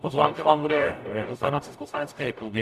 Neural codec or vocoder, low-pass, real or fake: codec, 44.1 kHz, 0.9 kbps, DAC; 14.4 kHz; fake